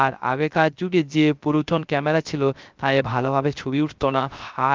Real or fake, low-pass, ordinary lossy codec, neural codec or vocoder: fake; 7.2 kHz; Opus, 24 kbps; codec, 16 kHz, 0.3 kbps, FocalCodec